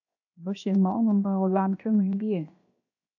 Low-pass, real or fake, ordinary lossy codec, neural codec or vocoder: 7.2 kHz; fake; AAC, 48 kbps; codec, 16 kHz, 0.7 kbps, FocalCodec